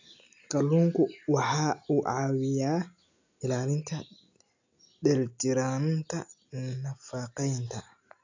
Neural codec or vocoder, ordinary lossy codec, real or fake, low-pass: none; AAC, 48 kbps; real; 7.2 kHz